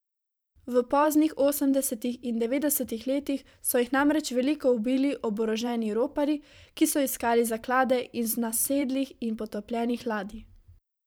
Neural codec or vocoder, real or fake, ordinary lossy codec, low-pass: vocoder, 44.1 kHz, 128 mel bands every 512 samples, BigVGAN v2; fake; none; none